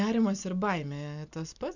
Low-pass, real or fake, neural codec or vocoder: 7.2 kHz; real; none